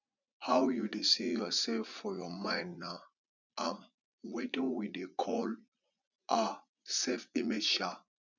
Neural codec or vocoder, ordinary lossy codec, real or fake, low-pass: vocoder, 44.1 kHz, 80 mel bands, Vocos; none; fake; 7.2 kHz